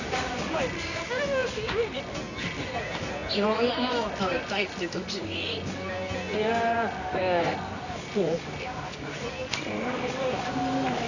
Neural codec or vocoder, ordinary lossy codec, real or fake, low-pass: codec, 24 kHz, 0.9 kbps, WavTokenizer, medium music audio release; none; fake; 7.2 kHz